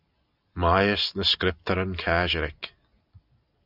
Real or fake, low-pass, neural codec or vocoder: real; 5.4 kHz; none